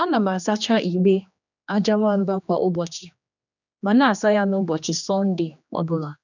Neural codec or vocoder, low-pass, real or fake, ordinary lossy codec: codec, 16 kHz, 2 kbps, X-Codec, HuBERT features, trained on general audio; 7.2 kHz; fake; none